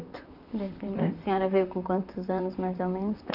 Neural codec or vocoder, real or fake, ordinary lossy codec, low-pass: vocoder, 44.1 kHz, 128 mel bands, Pupu-Vocoder; fake; none; 5.4 kHz